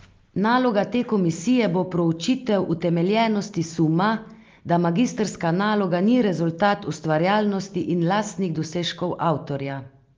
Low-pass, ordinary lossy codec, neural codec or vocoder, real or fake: 7.2 kHz; Opus, 32 kbps; none; real